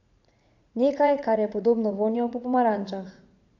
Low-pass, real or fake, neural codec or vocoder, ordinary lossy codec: 7.2 kHz; fake; vocoder, 44.1 kHz, 80 mel bands, Vocos; AAC, 48 kbps